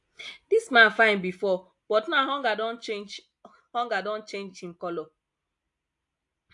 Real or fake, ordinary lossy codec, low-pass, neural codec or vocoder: real; AAC, 64 kbps; 10.8 kHz; none